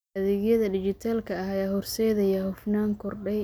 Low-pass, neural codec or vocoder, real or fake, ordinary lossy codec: none; none; real; none